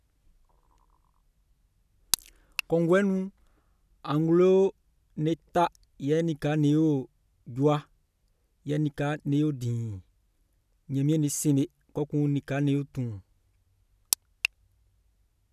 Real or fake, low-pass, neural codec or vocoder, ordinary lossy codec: real; 14.4 kHz; none; none